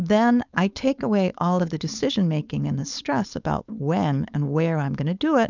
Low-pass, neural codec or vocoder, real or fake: 7.2 kHz; codec, 16 kHz, 4.8 kbps, FACodec; fake